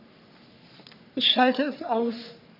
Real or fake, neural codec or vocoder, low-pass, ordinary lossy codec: fake; codec, 44.1 kHz, 3.4 kbps, Pupu-Codec; 5.4 kHz; none